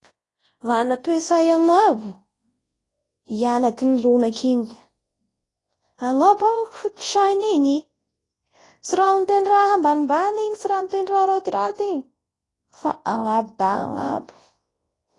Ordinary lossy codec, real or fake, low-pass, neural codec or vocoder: AAC, 32 kbps; fake; 10.8 kHz; codec, 24 kHz, 0.9 kbps, WavTokenizer, large speech release